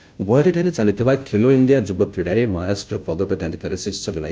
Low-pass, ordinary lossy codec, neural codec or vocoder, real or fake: none; none; codec, 16 kHz, 0.5 kbps, FunCodec, trained on Chinese and English, 25 frames a second; fake